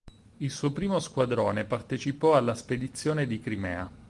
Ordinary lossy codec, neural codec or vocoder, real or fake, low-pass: Opus, 24 kbps; none; real; 10.8 kHz